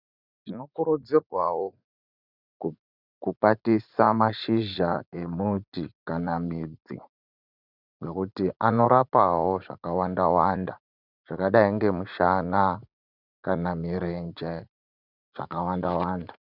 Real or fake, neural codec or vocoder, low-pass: fake; vocoder, 44.1 kHz, 80 mel bands, Vocos; 5.4 kHz